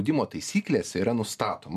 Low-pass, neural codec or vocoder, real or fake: 14.4 kHz; none; real